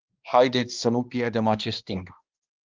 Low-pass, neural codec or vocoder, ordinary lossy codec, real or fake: 7.2 kHz; codec, 16 kHz, 1 kbps, X-Codec, HuBERT features, trained on balanced general audio; Opus, 32 kbps; fake